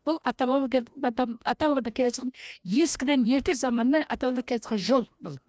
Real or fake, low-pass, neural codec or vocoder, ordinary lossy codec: fake; none; codec, 16 kHz, 1 kbps, FreqCodec, larger model; none